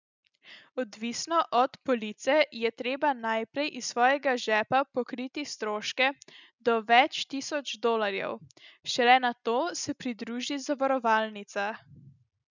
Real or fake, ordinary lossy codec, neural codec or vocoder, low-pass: real; none; none; 7.2 kHz